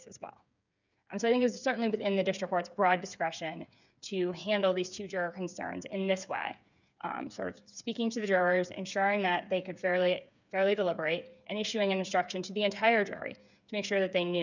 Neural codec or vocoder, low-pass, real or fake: codec, 16 kHz, 8 kbps, FreqCodec, smaller model; 7.2 kHz; fake